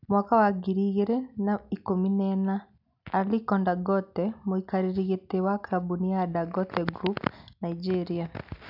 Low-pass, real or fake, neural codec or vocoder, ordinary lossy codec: 5.4 kHz; real; none; none